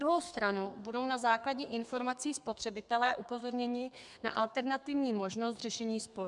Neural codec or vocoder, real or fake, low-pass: codec, 32 kHz, 1.9 kbps, SNAC; fake; 10.8 kHz